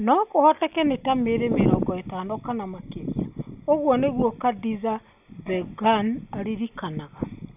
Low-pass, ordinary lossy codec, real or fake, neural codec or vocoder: 3.6 kHz; none; real; none